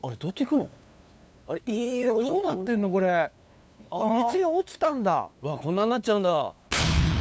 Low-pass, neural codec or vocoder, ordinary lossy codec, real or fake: none; codec, 16 kHz, 2 kbps, FunCodec, trained on LibriTTS, 25 frames a second; none; fake